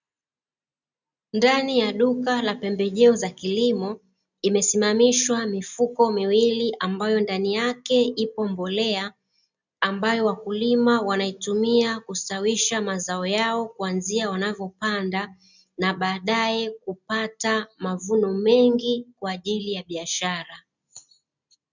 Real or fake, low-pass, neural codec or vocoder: real; 7.2 kHz; none